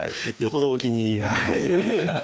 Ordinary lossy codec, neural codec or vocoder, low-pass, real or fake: none; codec, 16 kHz, 2 kbps, FreqCodec, larger model; none; fake